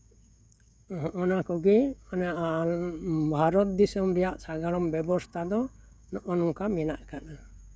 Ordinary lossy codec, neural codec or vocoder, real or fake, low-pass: none; codec, 16 kHz, 16 kbps, FreqCodec, smaller model; fake; none